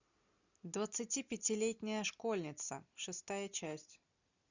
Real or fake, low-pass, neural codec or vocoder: real; 7.2 kHz; none